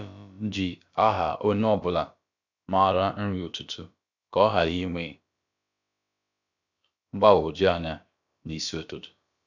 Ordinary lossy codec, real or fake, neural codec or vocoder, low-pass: none; fake; codec, 16 kHz, about 1 kbps, DyCAST, with the encoder's durations; 7.2 kHz